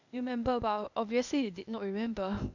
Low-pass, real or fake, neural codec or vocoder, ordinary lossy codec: 7.2 kHz; fake; codec, 16 kHz, 0.8 kbps, ZipCodec; none